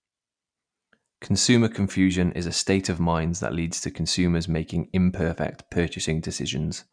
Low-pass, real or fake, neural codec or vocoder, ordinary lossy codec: 9.9 kHz; real; none; none